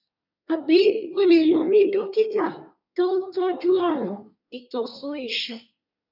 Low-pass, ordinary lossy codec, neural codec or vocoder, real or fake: 5.4 kHz; none; codec, 24 kHz, 1 kbps, SNAC; fake